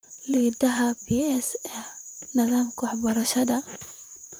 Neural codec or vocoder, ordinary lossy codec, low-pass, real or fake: vocoder, 44.1 kHz, 128 mel bands, Pupu-Vocoder; none; none; fake